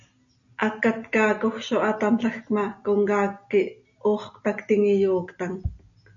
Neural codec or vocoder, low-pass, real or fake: none; 7.2 kHz; real